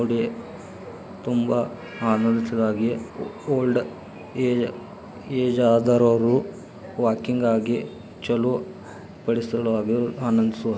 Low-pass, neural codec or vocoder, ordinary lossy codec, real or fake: none; none; none; real